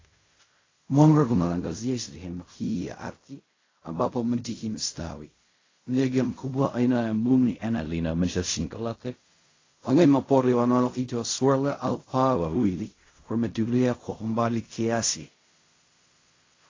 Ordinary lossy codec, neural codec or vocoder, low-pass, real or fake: AAC, 32 kbps; codec, 16 kHz in and 24 kHz out, 0.4 kbps, LongCat-Audio-Codec, fine tuned four codebook decoder; 7.2 kHz; fake